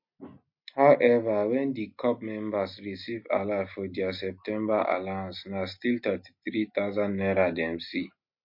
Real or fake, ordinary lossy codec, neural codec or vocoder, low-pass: real; MP3, 32 kbps; none; 5.4 kHz